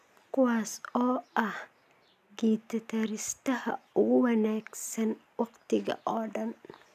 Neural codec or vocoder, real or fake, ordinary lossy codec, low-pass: none; real; none; 14.4 kHz